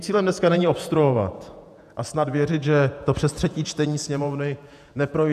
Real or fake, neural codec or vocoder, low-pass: fake; vocoder, 48 kHz, 128 mel bands, Vocos; 14.4 kHz